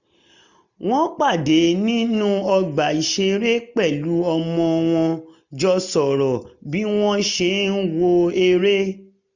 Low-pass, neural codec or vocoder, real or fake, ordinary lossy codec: 7.2 kHz; none; real; none